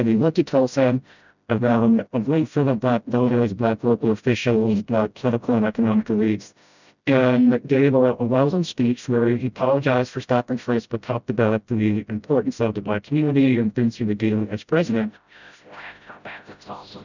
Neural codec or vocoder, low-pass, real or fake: codec, 16 kHz, 0.5 kbps, FreqCodec, smaller model; 7.2 kHz; fake